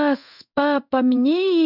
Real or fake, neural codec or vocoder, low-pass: fake; codec, 16 kHz in and 24 kHz out, 1 kbps, XY-Tokenizer; 5.4 kHz